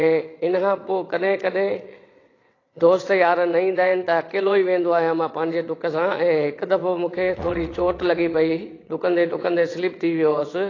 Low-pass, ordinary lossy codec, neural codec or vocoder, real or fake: 7.2 kHz; AAC, 32 kbps; vocoder, 22.05 kHz, 80 mel bands, WaveNeXt; fake